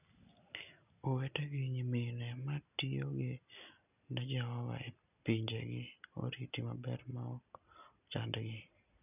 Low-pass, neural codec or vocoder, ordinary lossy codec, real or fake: 3.6 kHz; none; none; real